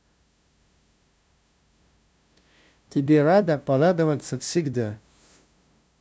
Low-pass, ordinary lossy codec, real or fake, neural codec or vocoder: none; none; fake; codec, 16 kHz, 0.5 kbps, FunCodec, trained on LibriTTS, 25 frames a second